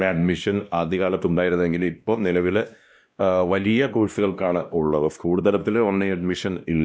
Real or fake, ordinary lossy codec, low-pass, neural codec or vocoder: fake; none; none; codec, 16 kHz, 1 kbps, X-Codec, WavLM features, trained on Multilingual LibriSpeech